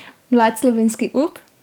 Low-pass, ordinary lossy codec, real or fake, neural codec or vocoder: 19.8 kHz; none; fake; codec, 44.1 kHz, 7.8 kbps, DAC